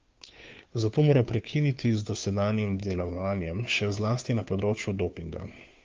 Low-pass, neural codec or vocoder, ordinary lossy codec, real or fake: 7.2 kHz; codec, 16 kHz, 2 kbps, FunCodec, trained on Chinese and English, 25 frames a second; Opus, 24 kbps; fake